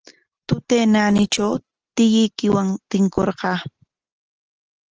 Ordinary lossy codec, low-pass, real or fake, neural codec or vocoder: Opus, 16 kbps; 7.2 kHz; real; none